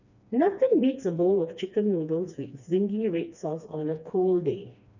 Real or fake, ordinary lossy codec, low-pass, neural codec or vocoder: fake; none; 7.2 kHz; codec, 16 kHz, 2 kbps, FreqCodec, smaller model